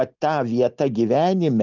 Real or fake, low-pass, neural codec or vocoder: real; 7.2 kHz; none